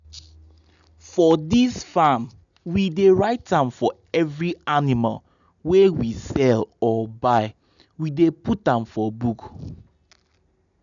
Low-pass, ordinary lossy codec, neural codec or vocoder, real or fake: 7.2 kHz; none; none; real